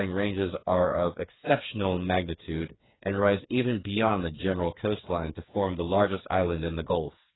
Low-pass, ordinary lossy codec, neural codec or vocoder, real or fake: 7.2 kHz; AAC, 16 kbps; codec, 16 kHz, 4 kbps, FreqCodec, smaller model; fake